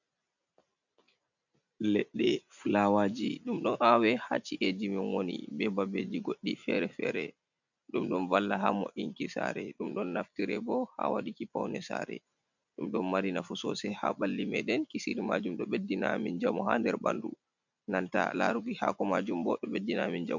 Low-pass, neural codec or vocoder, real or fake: 7.2 kHz; none; real